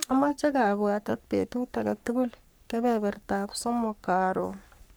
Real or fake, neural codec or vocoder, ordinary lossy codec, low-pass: fake; codec, 44.1 kHz, 3.4 kbps, Pupu-Codec; none; none